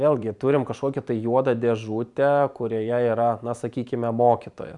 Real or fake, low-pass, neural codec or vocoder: fake; 10.8 kHz; vocoder, 44.1 kHz, 128 mel bands every 512 samples, BigVGAN v2